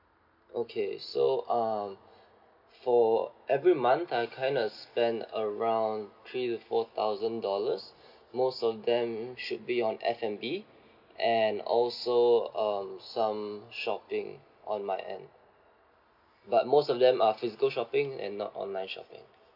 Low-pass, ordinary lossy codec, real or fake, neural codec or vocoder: 5.4 kHz; none; real; none